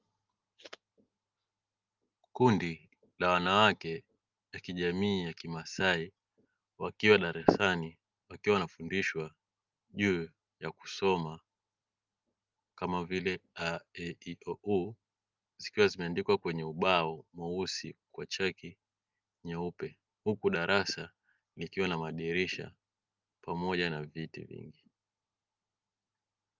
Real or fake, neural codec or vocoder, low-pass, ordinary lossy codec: real; none; 7.2 kHz; Opus, 24 kbps